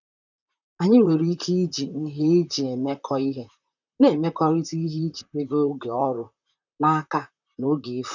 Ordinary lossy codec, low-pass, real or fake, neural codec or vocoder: none; 7.2 kHz; fake; vocoder, 44.1 kHz, 128 mel bands, Pupu-Vocoder